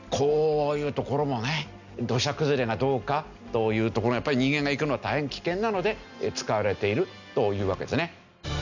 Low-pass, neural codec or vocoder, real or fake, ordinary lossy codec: 7.2 kHz; none; real; none